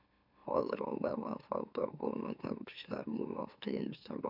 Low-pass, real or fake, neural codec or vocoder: 5.4 kHz; fake; autoencoder, 44.1 kHz, a latent of 192 numbers a frame, MeloTTS